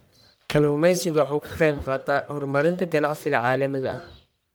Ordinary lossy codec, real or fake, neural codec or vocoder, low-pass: none; fake; codec, 44.1 kHz, 1.7 kbps, Pupu-Codec; none